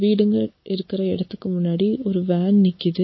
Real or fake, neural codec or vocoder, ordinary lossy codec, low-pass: real; none; MP3, 24 kbps; 7.2 kHz